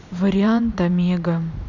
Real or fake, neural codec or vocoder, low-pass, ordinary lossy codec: fake; vocoder, 44.1 kHz, 128 mel bands every 512 samples, BigVGAN v2; 7.2 kHz; none